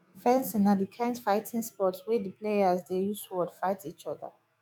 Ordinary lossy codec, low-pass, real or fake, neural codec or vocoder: none; none; fake; autoencoder, 48 kHz, 128 numbers a frame, DAC-VAE, trained on Japanese speech